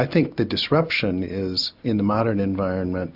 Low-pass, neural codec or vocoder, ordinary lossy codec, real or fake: 5.4 kHz; none; AAC, 48 kbps; real